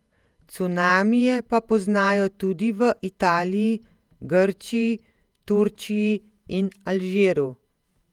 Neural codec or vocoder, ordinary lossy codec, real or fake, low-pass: vocoder, 48 kHz, 128 mel bands, Vocos; Opus, 32 kbps; fake; 19.8 kHz